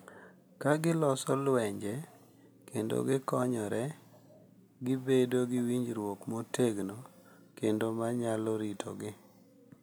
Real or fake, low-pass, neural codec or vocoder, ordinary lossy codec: real; none; none; none